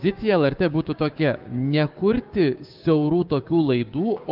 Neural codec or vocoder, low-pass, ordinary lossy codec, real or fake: codec, 16 kHz, 6 kbps, DAC; 5.4 kHz; Opus, 24 kbps; fake